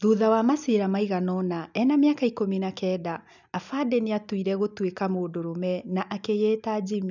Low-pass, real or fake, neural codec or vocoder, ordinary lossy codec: 7.2 kHz; real; none; none